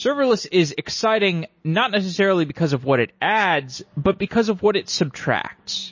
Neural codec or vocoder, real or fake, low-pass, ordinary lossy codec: none; real; 7.2 kHz; MP3, 32 kbps